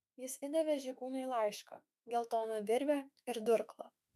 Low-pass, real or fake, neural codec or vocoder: 14.4 kHz; fake; autoencoder, 48 kHz, 32 numbers a frame, DAC-VAE, trained on Japanese speech